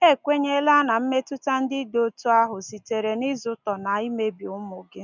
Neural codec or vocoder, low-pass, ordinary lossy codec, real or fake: none; 7.2 kHz; none; real